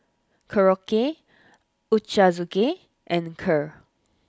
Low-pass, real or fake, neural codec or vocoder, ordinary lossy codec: none; real; none; none